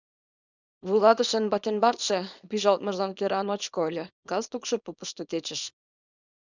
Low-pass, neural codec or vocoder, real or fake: 7.2 kHz; codec, 24 kHz, 0.9 kbps, WavTokenizer, small release; fake